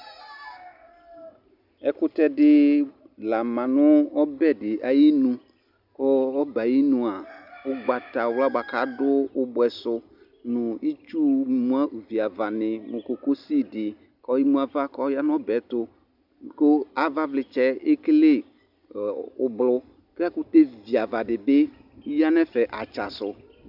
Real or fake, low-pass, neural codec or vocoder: real; 5.4 kHz; none